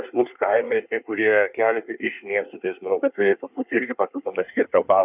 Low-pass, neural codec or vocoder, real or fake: 3.6 kHz; codec, 24 kHz, 1 kbps, SNAC; fake